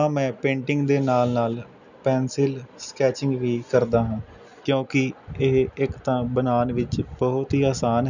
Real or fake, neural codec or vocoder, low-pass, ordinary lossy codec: real; none; 7.2 kHz; none